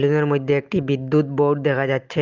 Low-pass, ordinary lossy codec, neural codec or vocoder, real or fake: 7.2 kHz; Opus, 24 kbps; none; real